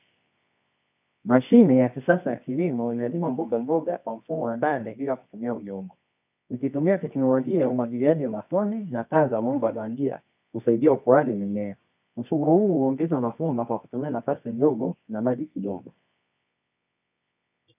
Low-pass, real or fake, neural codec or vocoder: 3.6 kHz; fake; codec, 24 kHz, 0.9 kbps, WavTokenizer, medium music audio release